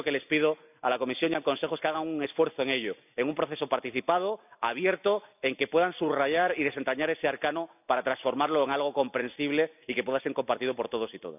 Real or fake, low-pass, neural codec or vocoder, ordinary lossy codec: real; 3.6 kHz; none; none